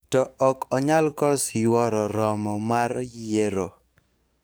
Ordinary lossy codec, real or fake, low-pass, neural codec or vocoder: none; fake; none; codec, 44.1 kHz, 7.8 kbps, DAC